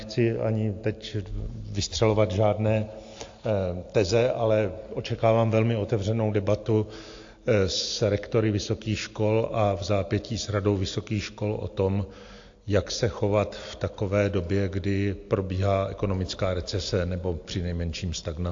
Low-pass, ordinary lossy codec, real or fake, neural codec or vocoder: 7.2 kHz; AAC, 48 kbps; real; none